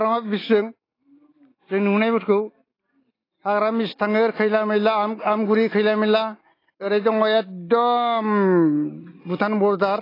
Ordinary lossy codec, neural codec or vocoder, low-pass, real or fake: AAC, 24 kbps; none; 5.4 kHz; real